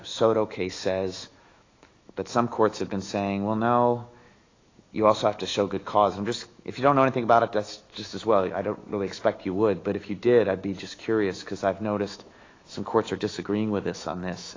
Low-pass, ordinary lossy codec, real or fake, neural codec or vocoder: 7.2 kHz; AAC, 32 kbps; fake; autoencoder, 48 kHz, 128 numbers a frame, DAC-VAE, trained on Japanese speech